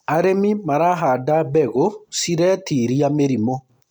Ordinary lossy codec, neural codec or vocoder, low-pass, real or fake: none; none; 19.8 kHz; real